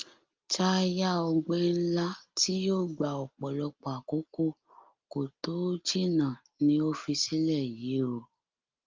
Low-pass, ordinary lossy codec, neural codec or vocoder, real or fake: 7.2 kHz; Opus, 32 kbps; none; real